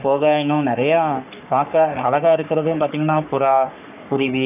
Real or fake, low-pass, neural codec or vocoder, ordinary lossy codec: fake; 3.6 kHz; codec, 44.1 kHz, 3.4 kbps, Pupu-Codec; none